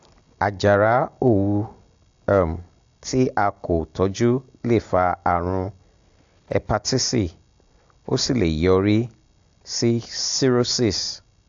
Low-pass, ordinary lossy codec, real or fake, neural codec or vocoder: 7.2 kHz; AAC, 64 kbps; real; none